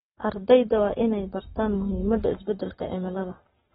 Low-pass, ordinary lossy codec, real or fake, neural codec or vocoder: 19.8 kHz; AAC, 16 kbps; fake; codec, 44.1 kHz, 7.8 kbps, Pupu-Codec